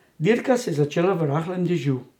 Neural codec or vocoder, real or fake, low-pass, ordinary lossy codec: none; real; 19.8 kHz; none